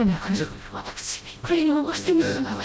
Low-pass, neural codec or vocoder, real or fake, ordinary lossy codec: none; codec, 16 kHz, 0.5 kbps, FreqCodec, smaller model; fake; none